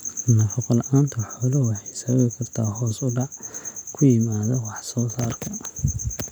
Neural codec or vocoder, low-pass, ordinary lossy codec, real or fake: none; none; none; real